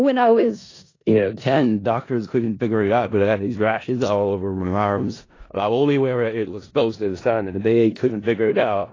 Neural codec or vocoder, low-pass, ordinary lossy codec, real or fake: codec, 16 kHz in and 24 kHz out, 0.4 kbps, LongCat-Audio-Codec, four codebook decoder; 7.2 kHz; AAC, 32 kbps; fake